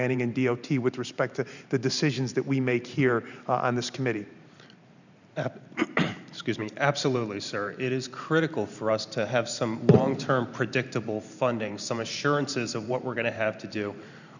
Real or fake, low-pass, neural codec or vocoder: fake; 7.2 kHz; vocoder, 44.1 kHz, 128 mel bands every 512 samples, BigVGAN v2